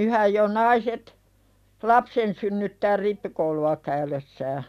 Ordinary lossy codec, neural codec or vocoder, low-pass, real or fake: none; none; 14.4 kHz; real